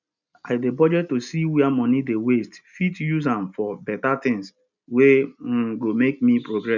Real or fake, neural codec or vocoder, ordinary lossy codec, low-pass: real; none; none; 7.2 kHz